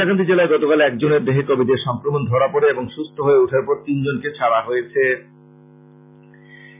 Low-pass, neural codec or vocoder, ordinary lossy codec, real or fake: 3.6 kHz; none; MP3, 32 kbps; real